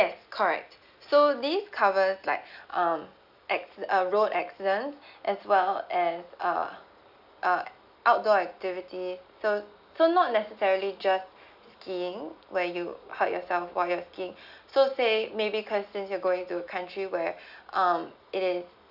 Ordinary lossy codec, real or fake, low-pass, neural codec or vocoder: none; real; 5.4 kHz; none